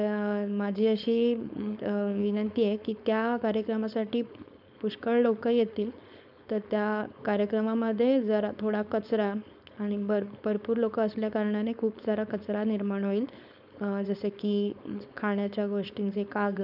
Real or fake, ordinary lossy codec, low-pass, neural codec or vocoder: fake; none; 5.4 kHz; codec, 16 kHz, 4.8 kbps, FACodec